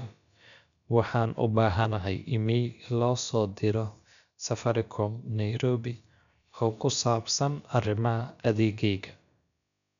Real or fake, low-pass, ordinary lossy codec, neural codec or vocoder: fake; 7.2 kHz; none; codec, 16 kHz, about 1 kbps, DyCAST, with the encoder's durations